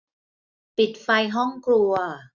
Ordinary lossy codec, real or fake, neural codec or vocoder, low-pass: none; real; none; 7.2 kHz